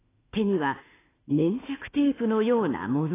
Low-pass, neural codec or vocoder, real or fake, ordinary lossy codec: 3.6 kHz; autoencoder, 48 kHz, 32 numbers a frame, DAC-VAE, trained on Japanese speech; fake; AAC, 16 kbps